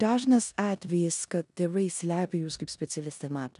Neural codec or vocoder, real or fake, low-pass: codec, 16 kHz in and 24 kHz out, 0.9 kbps, LongCat-Audio-Codec, four codebook decoder; fake; 10.8 kHz